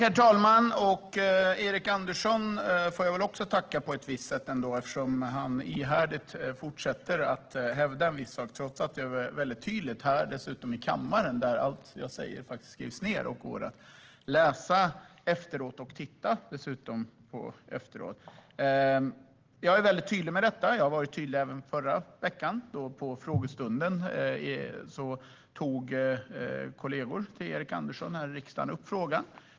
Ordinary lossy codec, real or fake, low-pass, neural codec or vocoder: Opus, 16 kbps; real; 7.2 kHz; none